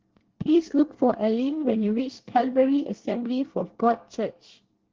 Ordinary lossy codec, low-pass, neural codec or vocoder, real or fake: Opus, 16 kbps; 7.2 kHz; codec, 24 kHz, 1 kbps, SNAC; fake